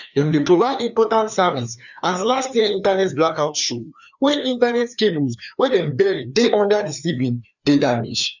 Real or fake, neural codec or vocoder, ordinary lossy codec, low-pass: fake; codec, 16 kHz, 2 kbps, FreqCodec, larger model; none; 7.2 kHz